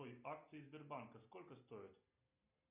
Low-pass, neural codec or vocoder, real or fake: 3.6 kHz; none; real